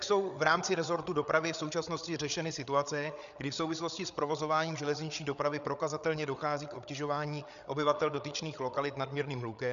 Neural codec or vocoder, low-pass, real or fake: codec, 16 kHz, 8 kbps, FreqCodec, larger model; 7.2 kHz; fake